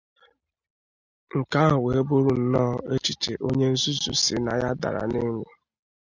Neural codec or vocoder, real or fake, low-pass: none; real; 7.2 kHz